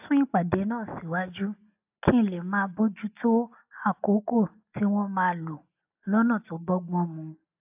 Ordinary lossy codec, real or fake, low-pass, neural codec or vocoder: AAC, 32 kbps; real; 3.6 kHz; none